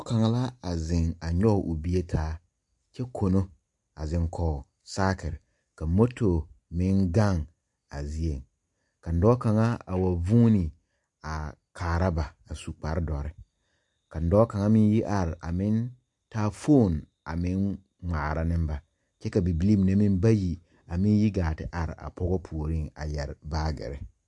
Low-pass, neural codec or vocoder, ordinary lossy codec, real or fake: 10.8 kHz; none; MP3, 64 kbps; real